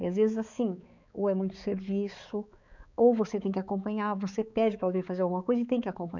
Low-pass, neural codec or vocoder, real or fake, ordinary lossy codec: 7.2 kHz; codec, 16 kHz, 4 kbps, X-Codec, HuBERT features, trained on balanced general audio; fake; none